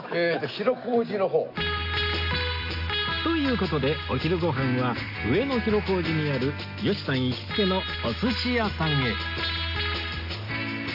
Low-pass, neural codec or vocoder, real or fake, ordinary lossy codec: 5.4 kHz; none; real; none